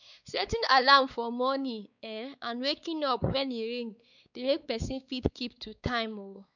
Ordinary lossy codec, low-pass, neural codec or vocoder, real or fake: none; 7.2 kHz; codec, 16 kHz, 4 kbps, X-Codec, WavLM features, trained on Multilingual LibriSpeech; fake